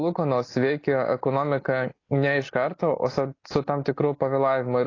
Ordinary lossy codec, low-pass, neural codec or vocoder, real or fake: AAC, 32 kbps; 7.2 kHz; none; real